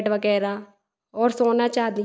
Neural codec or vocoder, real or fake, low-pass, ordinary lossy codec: none; real; none; none